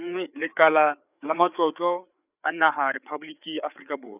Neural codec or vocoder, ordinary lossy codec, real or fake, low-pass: codec, 16 kHz, 4 kbps, FreqCodec, larger model; none; fake; 3.6 kHz